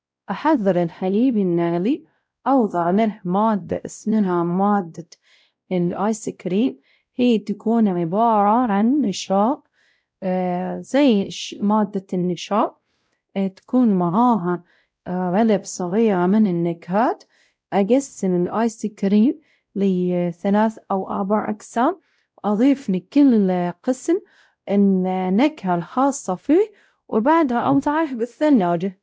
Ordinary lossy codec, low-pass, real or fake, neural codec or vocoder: none; none; fake; codec, 16 kHz, 0.5 kbps, X-Codec, WavLM features, trained on Multilingual LibriSpeech